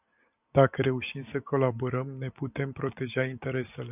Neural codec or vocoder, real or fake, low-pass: none; real; 3.6 kHz